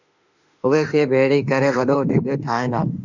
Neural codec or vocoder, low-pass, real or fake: autoencoder, 48 kHz, 32 numbers a frame, DAC-VAE, trained on Japanese speech; 7.2 kHz; fake